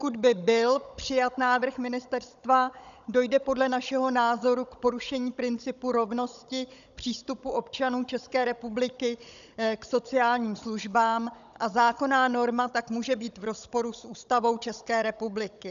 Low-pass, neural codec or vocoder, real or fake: 7.2 kHz; codec, 16 kHz, 16 kbps, FunCodec, trained on Chinese and English, 50 frames a second; fake